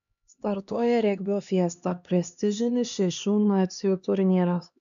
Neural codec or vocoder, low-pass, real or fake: codec, 16 kHz, 2 kbps, X-Codec, HuBERT features, trained on LibriSpeech; 7.2 kHz; fake